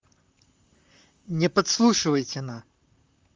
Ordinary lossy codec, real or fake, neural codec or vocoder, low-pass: Opus, 32 kbps; fake; vocoder, 44.1 kHz, 128 mel bands, Pupu-Vocoder; 7.2 kHz